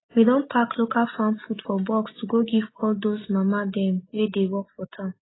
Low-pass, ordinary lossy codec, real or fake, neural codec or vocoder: 7.2 kHz; AAC, 16 kbps; real; none